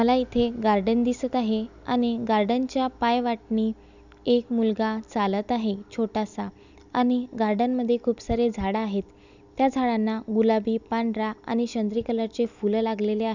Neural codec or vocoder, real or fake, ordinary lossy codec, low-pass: none; real; none; 7.2 kHz